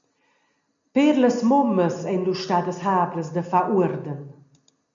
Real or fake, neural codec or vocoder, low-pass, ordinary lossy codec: real; none; 7.2 kHz; MP3, 64 kbps